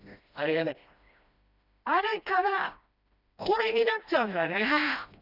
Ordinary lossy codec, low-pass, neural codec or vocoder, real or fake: none; 5.4 kHz; codec, 16 kHz, 1 kbps, FreqCodec, smaller model; fake